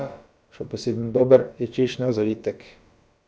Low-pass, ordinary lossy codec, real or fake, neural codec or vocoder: none; none; fake; codec, 16 kHz, about 1 kbps, DyCAST, with the encoder's durations